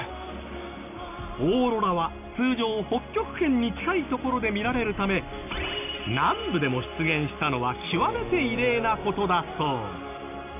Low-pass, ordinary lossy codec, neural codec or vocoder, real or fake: 3.6 kHz; none; none; real